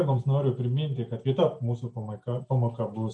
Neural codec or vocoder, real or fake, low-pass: none; real; 10.8 kHz